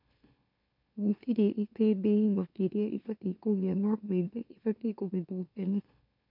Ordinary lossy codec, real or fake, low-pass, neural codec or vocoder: MP3, 48 kbps; fake; 5.4 kHz; autoencoder, 44.1 kHz, a latent of 192 numbers a frame, MeloTTS